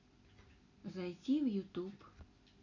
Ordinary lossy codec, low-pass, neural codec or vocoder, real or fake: none; 7.2 kHz; none; real